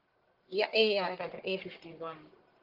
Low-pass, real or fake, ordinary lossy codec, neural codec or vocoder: 5.4 kHz; fake; Opus, 16 kbps; codec, 44.1 kHz, 1.7 kbps, Pupu-Codec